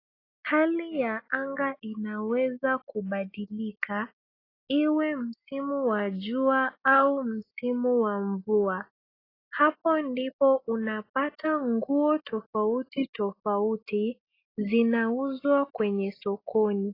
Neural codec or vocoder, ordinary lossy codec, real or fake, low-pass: none; AAC, 24 kbps; real; 5.4 kHz